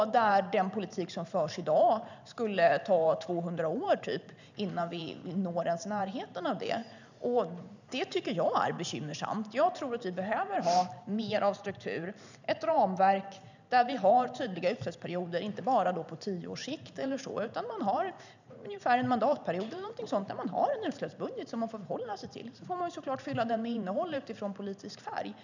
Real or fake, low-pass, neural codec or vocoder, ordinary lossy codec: fake; 7.2 kHz; vocoder, 44.1 kHz, 128 mel bands every 512 samples, BigVGAN v2; none